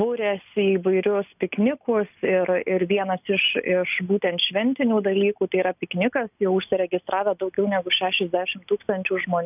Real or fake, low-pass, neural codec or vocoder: real; 3.6 kHz; none